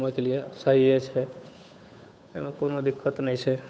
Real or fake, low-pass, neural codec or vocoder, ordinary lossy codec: fake; none; codec, 16 kHz, 8 kbps, FunCodec, trained on Chinese and English, 25 frames a second; none